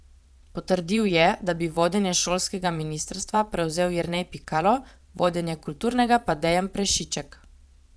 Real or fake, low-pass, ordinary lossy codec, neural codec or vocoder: fake; none; none; vocoder, 22.05 kHz, 80 mel bands, Vocos